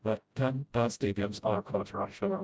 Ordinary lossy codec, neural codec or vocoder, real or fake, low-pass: none; codec, 16 kHz, 0.5 kbps, FreqCodec, smaller model; fake; none